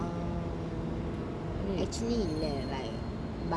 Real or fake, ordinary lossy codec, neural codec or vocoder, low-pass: real; none; none; none